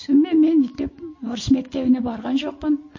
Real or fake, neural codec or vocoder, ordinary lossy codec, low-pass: real; none; MP3, 32 kbps; 7.2 kHz